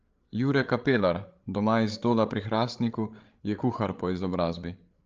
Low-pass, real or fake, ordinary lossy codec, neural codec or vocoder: 7.2 kHz; fake; Opus, 24 kbps; codec, 16 kHz, 8 kbps, FreqCodec, larger model